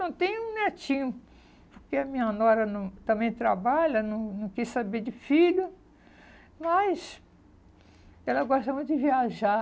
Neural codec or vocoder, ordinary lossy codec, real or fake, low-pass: none; none; real; none